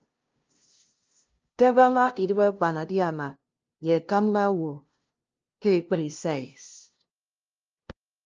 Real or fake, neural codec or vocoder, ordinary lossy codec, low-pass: fake; codec, 16 kHz, 0.5 kbps, FunCodec, trained on LibriTTS, 25 frames a second; Opus, 24 kbps; 7.2 kHz